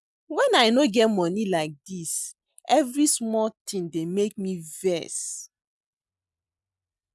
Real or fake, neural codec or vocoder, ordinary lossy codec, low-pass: real; none; none; none